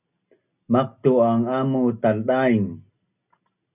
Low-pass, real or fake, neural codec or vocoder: 3.6 kHz; real; none